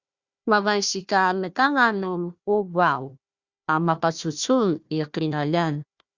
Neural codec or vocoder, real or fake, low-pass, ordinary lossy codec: codec, 16 kHz, 1 kbps, FunCodec, trained on Chinese and English, 50 frames a second; fake; 7.2 kHz; Opus, 64 kbps